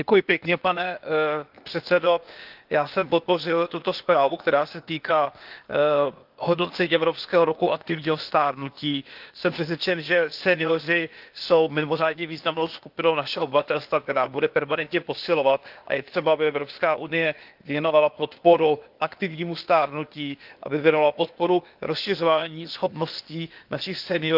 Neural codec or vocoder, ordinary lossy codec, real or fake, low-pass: codec, 16 kHz, 0.8 kbps, ZipCodec; Opus, 32 kbps; fake; 5.4 kHz